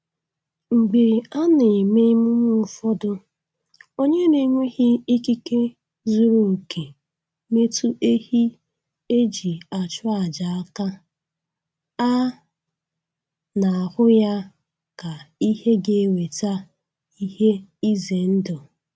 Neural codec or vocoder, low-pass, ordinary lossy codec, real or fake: none; none; none; real